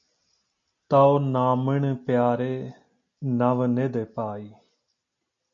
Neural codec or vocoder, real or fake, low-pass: none; real; 7.2 kHz